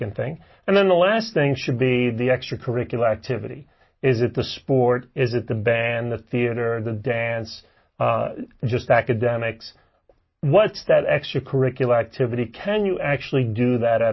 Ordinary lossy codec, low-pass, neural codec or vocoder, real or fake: MP3, 24 kbps; 7.2 kHz; none; real